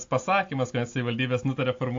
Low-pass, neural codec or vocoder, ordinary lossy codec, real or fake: 7.2 kHz; none; AAC, 48 kbps; real